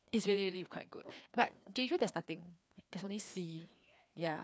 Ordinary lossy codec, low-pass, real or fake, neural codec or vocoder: none; none; fake; codec, 16 kHz, 2 kbps, FreqCodec, larger model